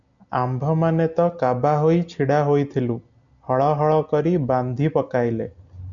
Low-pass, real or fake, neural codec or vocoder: 7.2 kHz; real; none